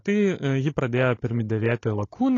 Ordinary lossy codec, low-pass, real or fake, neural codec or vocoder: AAC, 32 kbps; 7.2 kHz; fake; codec, 16 kHz, 16 kbps, FunCodec, trained on Chinese and English, 50 frames a second